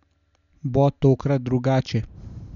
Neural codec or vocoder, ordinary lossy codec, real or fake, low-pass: none; none; real; 7.2 kHz